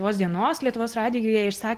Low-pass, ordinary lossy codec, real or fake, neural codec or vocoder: 14.4 kHz; Opus, 16 kbps; real; none